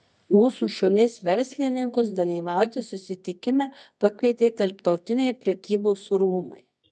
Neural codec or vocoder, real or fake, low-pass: codec, 24 kHz, 0.9 kbps, WavTokenizer, medium music audio release; fake; 10.8 kHz